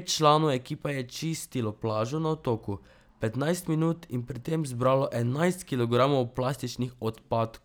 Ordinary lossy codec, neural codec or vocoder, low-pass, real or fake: none; none; none; real